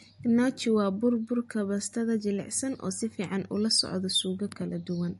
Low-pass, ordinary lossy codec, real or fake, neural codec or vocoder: 14.4 kHz; MP3, 48 kbps; real; none